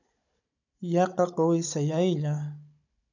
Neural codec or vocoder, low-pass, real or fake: codec, 16 kHz, 16 kbps, FunCodec, trained on Chinese and English, 50 frames a second; 7.2 kHz; fake